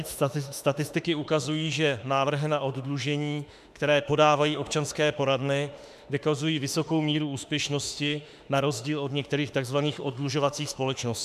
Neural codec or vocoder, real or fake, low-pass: autoencoder, 48 kHz, 32 numbers a frame, DAC-VAE, trained on Japanese speech; fake; 14.4 kHz